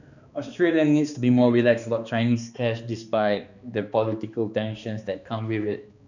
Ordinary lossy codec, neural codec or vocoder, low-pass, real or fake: none; codec, 16 kHz, 2 kbps, X-Codec, HuBERT features, trained on balanced general audio; 7.2 kHz; fake